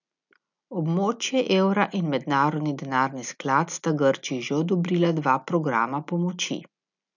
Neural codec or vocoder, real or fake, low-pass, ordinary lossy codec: none; real; 7.2 kHz; none